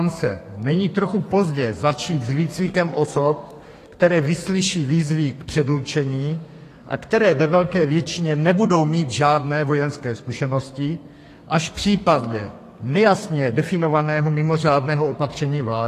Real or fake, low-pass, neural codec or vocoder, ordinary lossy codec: fake; 14.4 kHz; codec, 32 kHz, 1.9 kbps, SNAC; AAC, 48 kbps